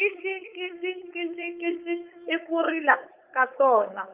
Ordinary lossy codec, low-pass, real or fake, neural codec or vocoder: Opus, 32 kbps; 3.6 kHz; fake; codec, 16 kHz, 4.8 kbps, FACodec